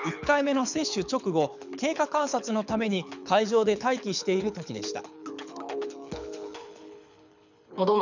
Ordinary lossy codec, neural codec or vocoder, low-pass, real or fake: none; codec, 24 kHz, 6 kbps, HILCodec; 7.2 kHz; fake